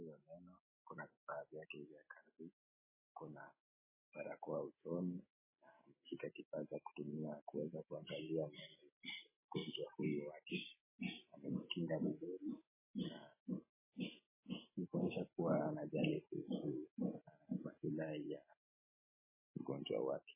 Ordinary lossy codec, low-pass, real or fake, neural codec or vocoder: MP3, 16 kbps; 3.6 kHz; real; none